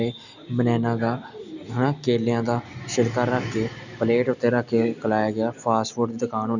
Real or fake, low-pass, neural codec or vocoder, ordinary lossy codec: real; 7.2 kHz; none; none